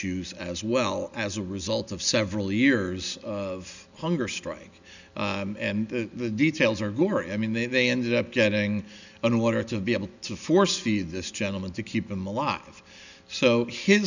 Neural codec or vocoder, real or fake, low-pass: none; real; 7.2 kHz